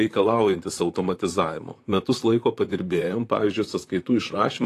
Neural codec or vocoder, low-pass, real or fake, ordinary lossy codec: vocoder, 44.1 kHz, 128 mel bands, Pupu-Vocoder; 14.4 kHz; fake; AAC, 48 kbps